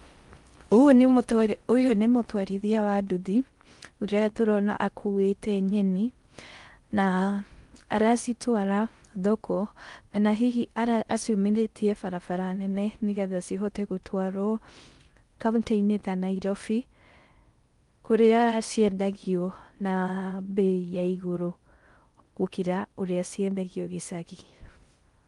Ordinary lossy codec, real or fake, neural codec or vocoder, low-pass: Opus, 32 kbps; fake; codec, 16 kHz in and 24 kHz out, 0.6 kbps, FocalCodec, streaming, 4096 codes; 10.8 kHz